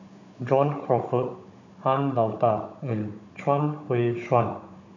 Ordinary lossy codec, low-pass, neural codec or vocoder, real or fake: none; 7.2 kHz; codec, 16 kHz, 16 kbps, FunCodec, trained on Chinese and English, 50 frames a second; fake